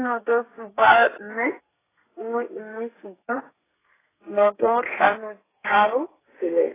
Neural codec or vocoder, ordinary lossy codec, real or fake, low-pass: codec, 32 kHz, 1.9 kbps, SNAC; AAC, 16 kbps; fake; 3.6 kHz